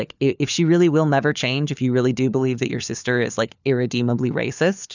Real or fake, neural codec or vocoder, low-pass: fake; codec, 16 kHz, 4 kbps, FunCodec, trained on LibriTTS, 50 frames a second; 7.2 kHz